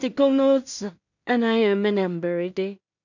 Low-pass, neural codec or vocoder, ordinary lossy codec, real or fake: 7.2 kHz; codec, 16 kHz in and 24 kHz out, 0.4 kbps, LongCat-Audio-Codec, two codebook decoder; none; fake